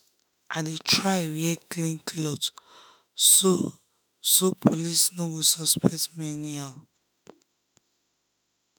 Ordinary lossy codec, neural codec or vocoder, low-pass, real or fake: none; autoencoder, 48 kHz, 32 numbers a frame, DAC-VAE, trained on Japanese speech; none; fake